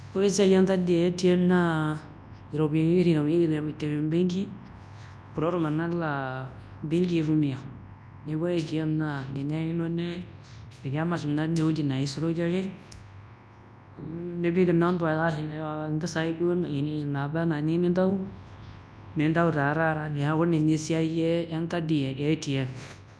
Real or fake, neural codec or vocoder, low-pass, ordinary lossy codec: fake; codec, 24 kHz, 0.9 kbps, WavTokenizer, large speech release; none; none